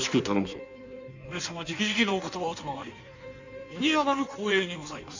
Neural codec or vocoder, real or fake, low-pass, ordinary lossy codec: codec, 16 kHz in and 24 kHz out, 1.1 kbps, FireRedTTS-2 codec; fake; 7.2 kHz; none